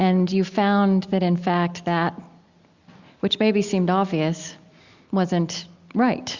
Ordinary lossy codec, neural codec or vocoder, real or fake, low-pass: Opus, 64 kbps; none; real; 7.2 kHz